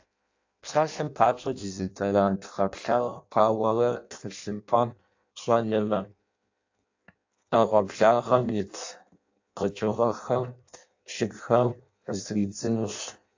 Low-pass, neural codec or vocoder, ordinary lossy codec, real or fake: 7.2 kHz; codec, 16 kHz in and 24 kHz out, 0.6 kbps, FireRedTTS-2 codec; AAC, 48 kbps; fake